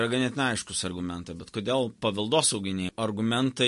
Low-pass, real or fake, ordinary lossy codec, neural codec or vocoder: 14.4 kHz; real; MP3, 48 kbps; none